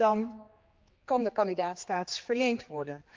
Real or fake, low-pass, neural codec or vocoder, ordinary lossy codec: fake; 7.2 kHz; codec, 16 kHz, 2 kbps, X-Codec, HuBERT features, trained on general audio; Opus, 32 kbps